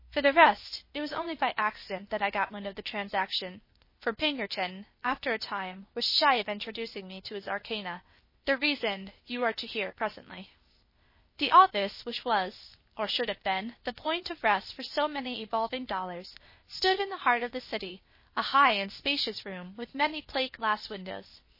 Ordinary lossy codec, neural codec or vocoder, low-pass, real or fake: MP3, 24 kbps; codec, 16 kHz, 0.8 kbps, ZipCodec; 5.4 kHz; fake